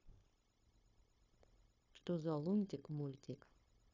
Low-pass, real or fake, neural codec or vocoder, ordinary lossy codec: 7.2 kHz; fake; codec, 16 kHz, 0.9 kbps, LongCat-Audio-Codec; AAC, 32 kbps